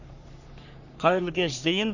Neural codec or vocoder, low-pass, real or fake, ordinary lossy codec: codec, 44.1 kHz, 3.4 kbps, Pupu-Codec; 7.2 kHz; fake; none